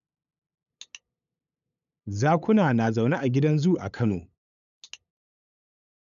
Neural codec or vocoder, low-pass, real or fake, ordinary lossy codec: codec, 16 kHz, 8 kbps, FunCodec, trained on LibriTTS, 25 frames a second; 7.2 kHz; fake; none